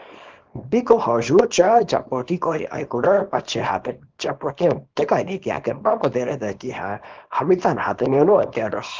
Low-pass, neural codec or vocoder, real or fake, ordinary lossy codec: 7.2 kHz; codec, 24 kHz, 0.9 kbps, WavTokenizer, small release; fake; Opus, 16 kbps